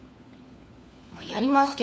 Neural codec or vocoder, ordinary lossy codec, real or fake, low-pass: codec, 16 kHz, 4 kbps, FunCodec, trained on LibriTTS, 50 frames a second; none; fake; none